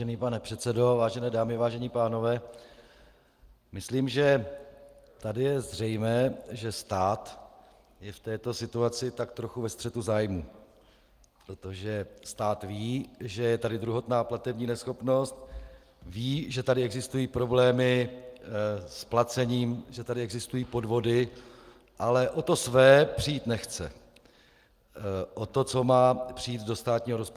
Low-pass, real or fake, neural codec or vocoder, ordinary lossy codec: 14.4 kHz; real; none; Opus, 32 kbps